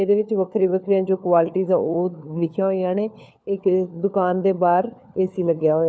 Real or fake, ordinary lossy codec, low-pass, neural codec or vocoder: fake; none; none; codec, 16 kHz, 4 kbps, FunCodec, trained on LibriTTS, 50 frames a second